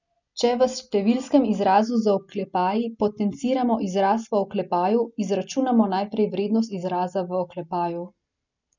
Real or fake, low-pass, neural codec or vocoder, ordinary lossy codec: real; 7.2 kHz; none; none